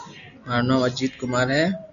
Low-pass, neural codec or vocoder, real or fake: 7.2 kHz; none; real